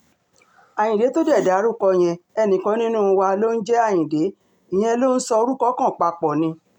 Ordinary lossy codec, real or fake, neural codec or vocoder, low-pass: none; real; none; 19.8 kHz